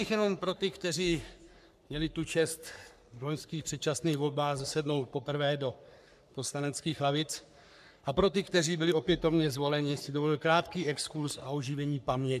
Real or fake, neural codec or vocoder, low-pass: fake; codec, 44.1 kHz, 3.4 kbps, Pupu-Codec; 14.4 kHz